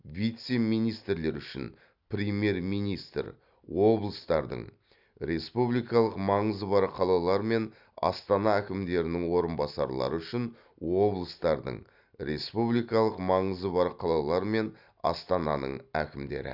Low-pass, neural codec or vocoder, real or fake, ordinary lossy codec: 5.4 kHz; none; real; none